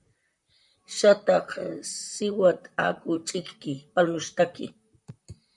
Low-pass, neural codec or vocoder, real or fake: 10.8 kHz; vocoder, 44.1 kHz, 128 mel bands, Pupu-Vocoder; fake